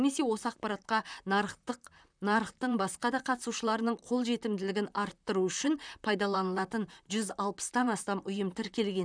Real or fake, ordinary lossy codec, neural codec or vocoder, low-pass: fake; none; vocoder, 44.1 kHz, 128 mel bands, Pupu-Vocoder; 9.9 kHz